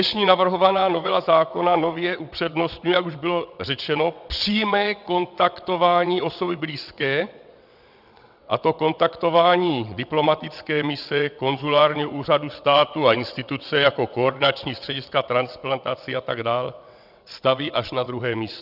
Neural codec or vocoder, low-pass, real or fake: vocoder, 22.05 kHz, 80 mel bands, WaveNeXt; 5.4 kHz; fake